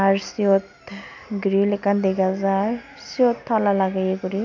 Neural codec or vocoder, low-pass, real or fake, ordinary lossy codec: none; 7.2 kHz; real; none